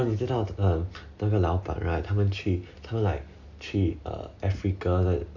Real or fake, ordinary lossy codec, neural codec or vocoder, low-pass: real; none; none; 7.2 kHz